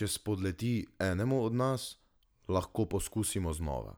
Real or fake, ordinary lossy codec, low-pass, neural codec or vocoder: real; none; none; none